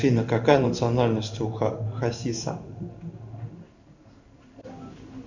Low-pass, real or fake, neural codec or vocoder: 7.2 kHz; fake; codec, 16 kHz in and 24 kHz out, 1 kbps, XY-Tokenizer